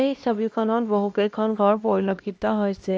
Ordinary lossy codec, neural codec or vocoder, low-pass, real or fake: none; codec, 16 kHz, 1 kbps, X-Codec, HuBERT features, trained on LibriSpeech; none; fake